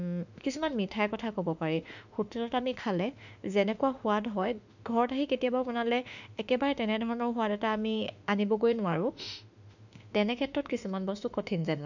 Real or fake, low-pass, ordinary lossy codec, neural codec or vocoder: fake; 7.2 kHz; none; autoencoder, 48 kHz, 32 numbers a frame, DAC-VAE, trained on Japanese speech